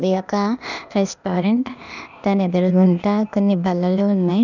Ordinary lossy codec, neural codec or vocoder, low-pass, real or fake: none; codec, 16 kHz, 0.8 kbps, ZipCodec; 7.2 kHz; fake